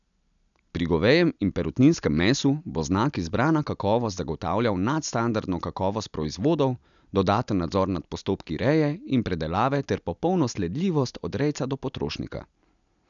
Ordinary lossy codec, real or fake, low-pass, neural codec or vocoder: none; real; 7.2 kHz; none